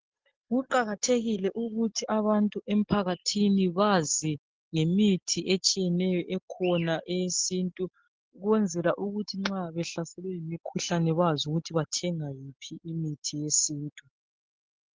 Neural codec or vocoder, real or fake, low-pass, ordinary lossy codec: none; real; 7.2 kHz; Opus, 16 kbps